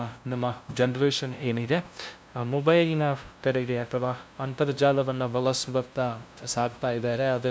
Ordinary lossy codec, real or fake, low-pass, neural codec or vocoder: none; fake; none; codec, 16 kHz, 0.5 kbps, FunCodec, trained on LibriTTS, 25 frames a second